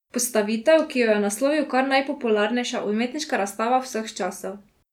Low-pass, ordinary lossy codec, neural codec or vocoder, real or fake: 19.8 kHz; none; none; real